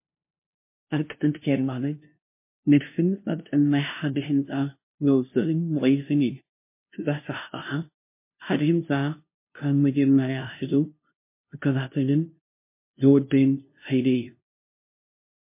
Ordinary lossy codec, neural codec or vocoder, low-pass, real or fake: MP3, 24 kbps; codec, 16 kHz, 0.5 kbps, FunCodec, trained on LibriTTS, 25 frames a second; 3.6 kHz; fake